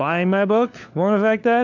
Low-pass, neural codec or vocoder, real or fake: 7.2 kHz; codec, 16 kHz, 4 kbps, FunCodec, trained on LibriTTS, 50 frames a second; fake